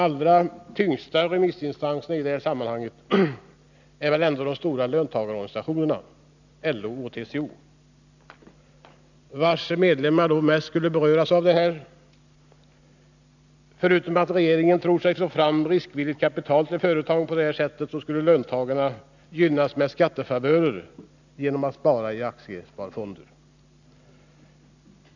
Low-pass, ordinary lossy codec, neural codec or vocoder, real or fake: 7.2 kHz; none; none; real